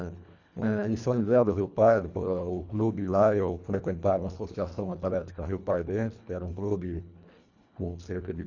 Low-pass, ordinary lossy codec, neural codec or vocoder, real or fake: 7.2 kHz; none; codec, 24 kHz, 1.5 kbps, HILCodec; fake